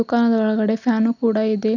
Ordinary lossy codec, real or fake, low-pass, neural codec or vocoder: none; real; 7.2 kHz; none